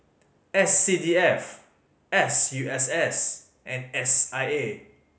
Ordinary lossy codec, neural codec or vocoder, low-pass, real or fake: none; none; none; real